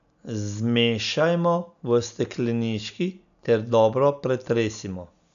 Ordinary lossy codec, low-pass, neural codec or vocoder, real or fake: none; 7.2 kHz; none; real